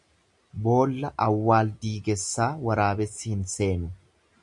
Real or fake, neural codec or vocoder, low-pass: real; none; 10.8 kHz